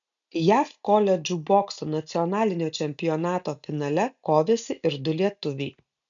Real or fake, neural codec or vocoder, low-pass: real; none; 7.2 kHz